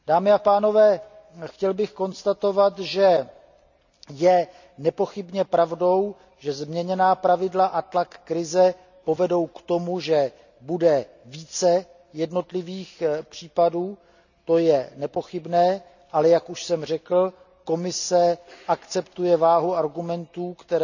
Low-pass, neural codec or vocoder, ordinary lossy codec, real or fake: 7.2 kHz; none; none; real